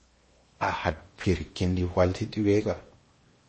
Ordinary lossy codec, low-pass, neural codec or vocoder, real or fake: MP3, 32 kbps; 10.8 kHz; codec, 16 kHz in and 24 kHz out, 0.8 kbps, FocalCodec, streaming, 65536 codes; fake